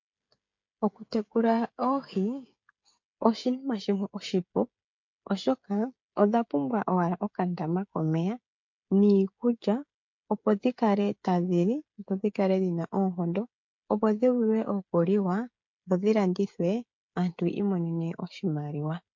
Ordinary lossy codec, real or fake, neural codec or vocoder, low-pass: MP3, 48 kbps; fake; codec, 16 kHz, 16 kbps, FreqCodec, smaller model; 7.2 kHz